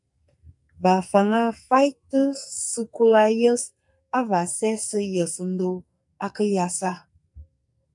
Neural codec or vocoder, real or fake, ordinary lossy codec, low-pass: codec, 44.1 kHz, 2.6 kbps, SNAC; fake; MP3, 96 kbps; 10.8 kHz